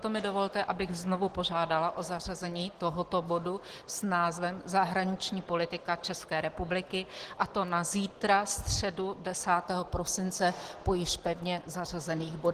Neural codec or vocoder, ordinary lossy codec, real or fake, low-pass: none; Opus, 16 kbps; real; 14.4 kHz